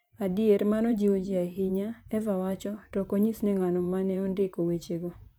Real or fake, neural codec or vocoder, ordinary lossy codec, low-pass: fake; vocoder, 44.1 kHz, 128 mel bands, Pupu-Vocoder; none; none